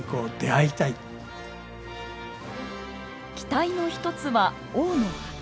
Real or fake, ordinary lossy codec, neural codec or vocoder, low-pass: real; none; none; none